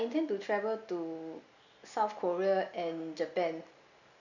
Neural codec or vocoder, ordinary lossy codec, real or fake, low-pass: none; none; real; 7.2 kHz